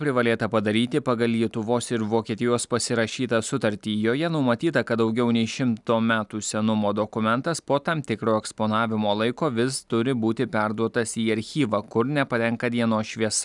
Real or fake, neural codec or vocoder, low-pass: real; none; 10.8 kHz